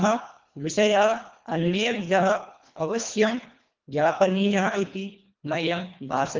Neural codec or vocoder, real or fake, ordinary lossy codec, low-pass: codec, 24 kHz, 1.5 kbps, HILCodec; fake; Opus, 32 kbps; 7.2 kHz